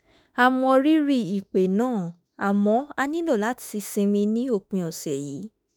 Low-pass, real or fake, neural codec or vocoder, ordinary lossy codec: none; fake; autoencoder, 48 kHz, 32 numbers a frame, DAC-VAE, trained on Japanese speech; none